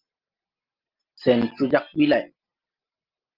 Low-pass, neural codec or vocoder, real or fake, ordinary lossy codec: 5.4 kHz; none; real; Opus, 24 kbps